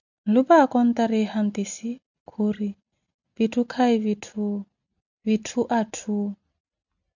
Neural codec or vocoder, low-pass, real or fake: none; 7.2 kHz; real